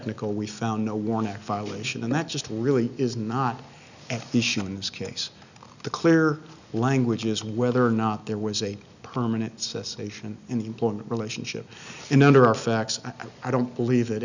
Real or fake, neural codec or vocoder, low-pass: real; none; 7.2 kHz